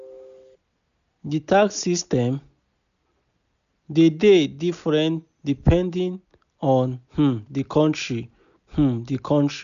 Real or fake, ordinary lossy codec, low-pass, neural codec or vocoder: real; none; 7.2 kHz; none